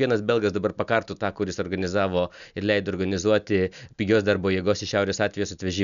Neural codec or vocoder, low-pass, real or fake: none; 7.2 kHz; real